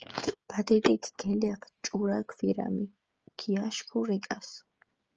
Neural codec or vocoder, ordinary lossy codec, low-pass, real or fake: codec, 16 kHz, 16 kbps, FreqCodec, smaller model; Opus, 24 kbps; 7.2 kHz; fake